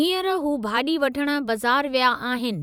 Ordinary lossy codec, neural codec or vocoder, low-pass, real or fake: none; vocoder, 44.1 kHz, 128 mel bands every 256 samples, BigVGAN v2; 19.8 kHz; fake